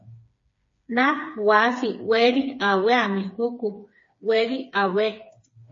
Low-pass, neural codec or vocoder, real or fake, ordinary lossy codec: 7.2 kHz; codec, 16 kHz, 4 kbps, FreqCodec, smaller model; fake; MP3, 32 kbps